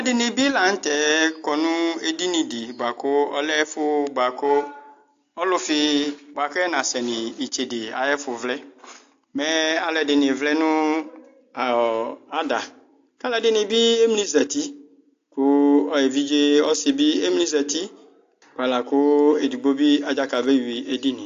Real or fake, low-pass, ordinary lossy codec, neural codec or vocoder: real; 7.2 kHz; AAC, 48 kbps; none